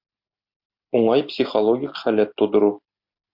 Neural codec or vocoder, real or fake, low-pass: none; real; 5.4 kHz